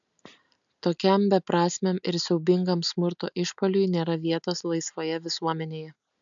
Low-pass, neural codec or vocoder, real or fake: 7.2 kHz; none; real